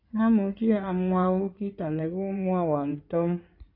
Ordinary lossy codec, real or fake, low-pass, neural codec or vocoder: AAC, 48 kbps; fake; 5.4 kHz; codec, 16 kHz in and 24 kHz out, 2.2 kbps, FireRedTTS-2 codec